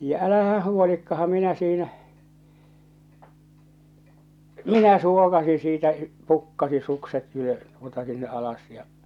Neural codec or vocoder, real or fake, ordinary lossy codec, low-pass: none; real; none; 19.8 kHz